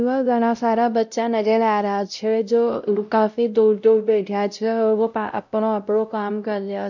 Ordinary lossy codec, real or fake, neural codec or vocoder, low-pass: none; fake; codec, 16 kHz, 0.5 kbps, X-Codec, WavLM features, trained on Multilingual LibriSpeech; 7.2 kHz